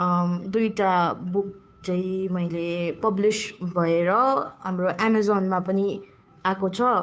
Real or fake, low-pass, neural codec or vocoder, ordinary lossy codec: fake; none; codec, 16 kHz, 2 kbps, FunCodec, trained on Chinese and English, 25 frames a second; none